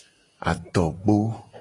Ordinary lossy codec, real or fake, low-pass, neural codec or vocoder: AAC, 32 kbps; real; 10.8 kHz; none